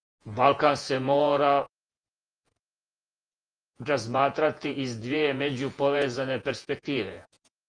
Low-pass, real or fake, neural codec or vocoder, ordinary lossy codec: 9.9 kHz; fake; vocoder, 48 kHz, 128 mel bands, Vocos; Opus, 32 kbps